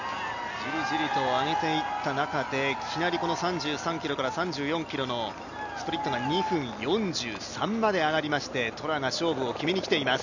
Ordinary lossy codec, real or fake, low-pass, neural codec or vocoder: none; real; 7.2 kHz; none